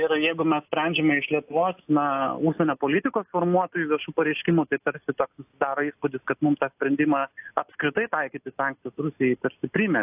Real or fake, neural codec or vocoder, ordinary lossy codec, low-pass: real; none; AAC, 32 kbps; 3.6 kHz